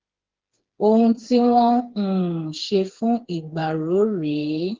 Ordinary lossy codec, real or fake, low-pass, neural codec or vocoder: Opus, 16 kbps; fake; 7.2 kHz; codec, 16 kHz, 4 kbps, FreqCodec, smaller model